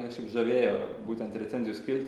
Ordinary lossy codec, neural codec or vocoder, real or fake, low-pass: Opus, 24 kbps; none; real; 19.8 kHz